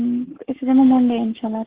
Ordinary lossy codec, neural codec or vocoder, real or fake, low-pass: Opus, 16 kbps; codec, 44.1 kHz, 7.8 kbps, Pupu-Codec; fake; 3.6 kHz